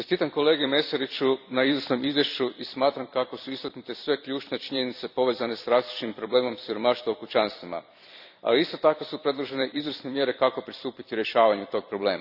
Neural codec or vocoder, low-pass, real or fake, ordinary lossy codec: vocoder, 44.1 kHz, 128 mel bands every 256 samples, BigVGAN v2; 5.4 kHz; fake; none